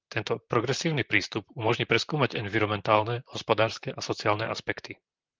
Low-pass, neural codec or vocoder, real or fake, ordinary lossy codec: 7.2 kHz; vocoder, 44.1 kHz, 128 mel bands, Pupu-Vocoder; fake; Opus, 24 kbps